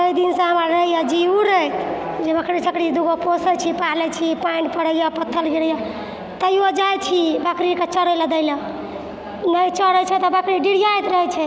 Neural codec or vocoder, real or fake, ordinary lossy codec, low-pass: none; real; none; none